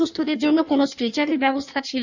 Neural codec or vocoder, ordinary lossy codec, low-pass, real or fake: codec, 16 kHz in and 24 kHz out, 1.1 kbps, FireRedTTS-2 codec; none; 7.2 kHz; fake